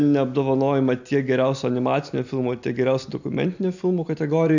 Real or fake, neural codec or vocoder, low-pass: real; none; 7.2 kHz